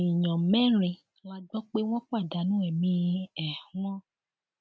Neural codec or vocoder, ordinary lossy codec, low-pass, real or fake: none; none; none; real